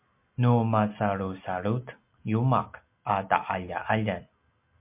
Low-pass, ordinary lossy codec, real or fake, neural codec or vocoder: 3.6 kHz; MP3, 32 kbps; real; none